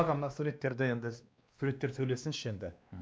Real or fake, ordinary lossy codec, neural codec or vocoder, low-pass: fake; none; codec, 16 kHz, 2 kbps, X-Codec, WavLM features, trained on Multilingual LibriSpeech; none